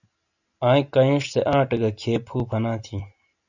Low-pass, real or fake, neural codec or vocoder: 7.2 kHz; real; none